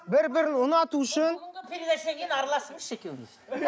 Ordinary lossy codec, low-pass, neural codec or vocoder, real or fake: none; none; none; real